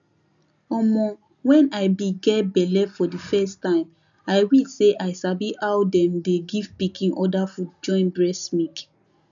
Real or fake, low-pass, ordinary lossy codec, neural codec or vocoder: real; 7.2 kHz; none; none